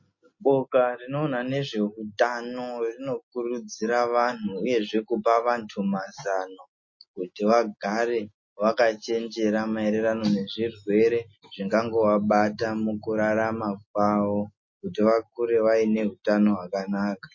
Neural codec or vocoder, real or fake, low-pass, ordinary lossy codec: none; real; 7.2 kHz; MP3, 32 kbps